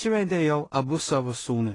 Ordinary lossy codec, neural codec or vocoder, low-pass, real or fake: AAC, 32 kbps; codec, 16 kHz in and 24 kHz out, 0.4 kbps, LongCat-Audio-Codec, two codebook decoder; 10.8 kHz; fake